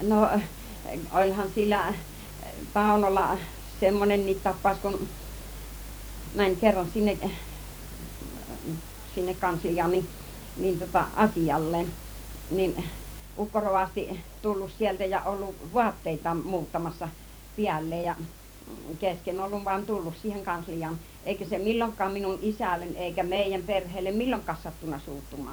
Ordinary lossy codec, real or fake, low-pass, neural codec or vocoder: none; fake; none; vocoder, 44.1 kHz, 128 mel bands every 512 samples, BigVGAN v2